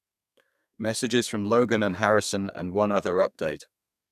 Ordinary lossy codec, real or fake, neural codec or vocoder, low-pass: none; fake; codec, 32 kHz, 1.9 kbps, SNAC; 14.4 kHz